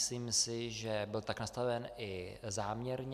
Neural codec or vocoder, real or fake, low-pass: none; real; 14.4 kHz